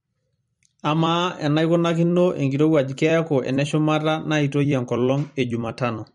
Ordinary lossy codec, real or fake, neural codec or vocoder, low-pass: MP3, 48 kbps; fake; vocoder, 44.1 kHz, 128 mel bands every 256 samples, BigVGAN v2; 19.8 kHz